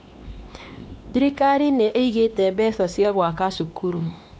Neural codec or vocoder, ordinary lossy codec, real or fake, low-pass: codec, 16 kHz, 2 kbps, X-Codec, WavLM features, trained on Multilingual LibriSpeech; none; fake; none